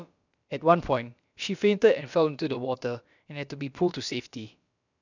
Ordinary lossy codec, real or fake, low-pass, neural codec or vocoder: none; fake; 7.2 kHz; codec, 16 kHz, about 1 kbps, DyCAST, with the encoder's durations